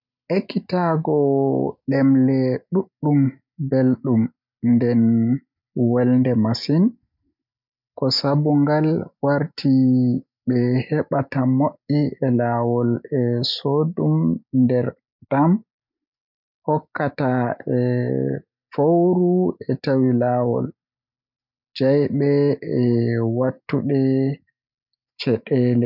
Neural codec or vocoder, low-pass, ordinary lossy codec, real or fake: none; 5.4 kHz; none; real